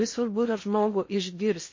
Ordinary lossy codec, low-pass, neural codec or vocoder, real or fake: MP3, 32 kbps; 7.2 kHz; codec, 16 kHz in and 24 kHz out, 0.6 kbps, FocalCodec, streaming, 2048 codes; fake